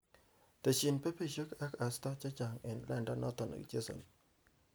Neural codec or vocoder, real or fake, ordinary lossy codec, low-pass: none; real; none; none